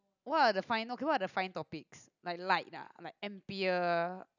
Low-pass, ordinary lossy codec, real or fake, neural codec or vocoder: 7.2 kHz; none; real; none